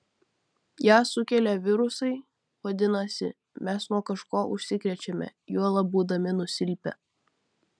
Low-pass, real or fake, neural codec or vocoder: 9.9 kHz; real; none